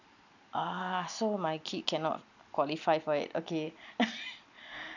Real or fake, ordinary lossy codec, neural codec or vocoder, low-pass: real; none; none; 7.2 kHz